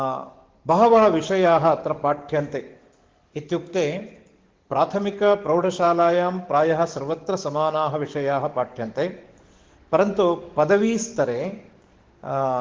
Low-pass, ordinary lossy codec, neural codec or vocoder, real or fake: 7.2 kHz; Opus, 16 kbps; codec, 44.1 kHz, 7.8 kbps, DAC; fake